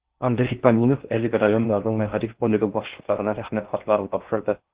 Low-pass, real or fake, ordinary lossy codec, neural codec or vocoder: 3.6 kHz; fake; Opus, 16 kbps; codec, 16 kHz in and 24 kHz out, 0.6 kbps, FocalCodec, streaming, 4096 codes